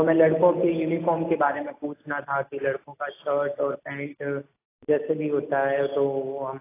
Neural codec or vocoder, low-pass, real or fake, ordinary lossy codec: none; 3.6 kHz; real; AAC, 24 kbps